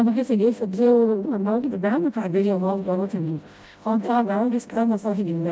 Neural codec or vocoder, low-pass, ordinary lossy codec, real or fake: codec, 16 kHz, 0.5 kbps, FreqCodec, smaller model; none; none; fake